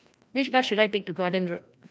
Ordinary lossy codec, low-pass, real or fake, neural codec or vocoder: none; none; fake; codec, 16 kHz, 1 kbps, FreqCodec, larger model